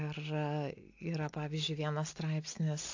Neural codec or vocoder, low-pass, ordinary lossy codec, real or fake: none; 7.2 kHz; AAC, 48 kbps; real